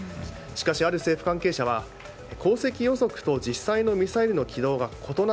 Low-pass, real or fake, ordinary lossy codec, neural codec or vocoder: none; real; none; none